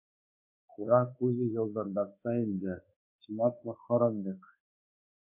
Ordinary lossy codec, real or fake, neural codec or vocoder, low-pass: MP3, 24 kbps; fake; codec, 24 kHz, 1.2 kbps, DualCodec; 3.6 kHz